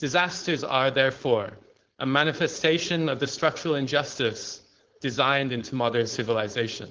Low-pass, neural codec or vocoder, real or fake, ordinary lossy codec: 7.2 kHz; codec, 16 kHz, 4.8 kbps, FACodec; fake; Opus, 16 kbps